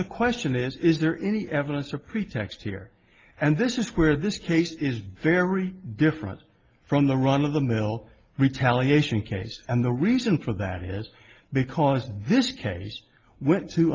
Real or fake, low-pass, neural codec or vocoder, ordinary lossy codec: real; 7.2 kHz; none; Opus, 24 kbps